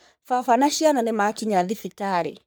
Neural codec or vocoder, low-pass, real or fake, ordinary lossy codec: codec, 44.1 kHz, 3.4 kbps, Pupu-Codec; none; fake; none